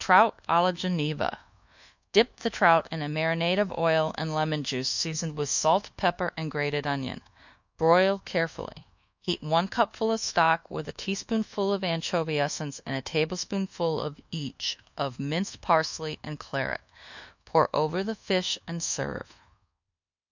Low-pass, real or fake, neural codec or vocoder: 7.2 kHz; fake; codec, 24 kHz, 1.2 kbps, DualCodec